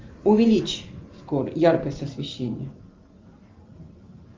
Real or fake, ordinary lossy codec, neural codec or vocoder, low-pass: real; Opus, 32 kbps; none; 7.2 kHz